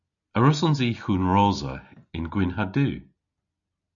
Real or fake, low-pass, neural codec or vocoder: real; 7.2 kHz; none